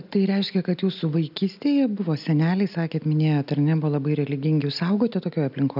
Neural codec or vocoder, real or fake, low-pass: none; real; 5.4 kHz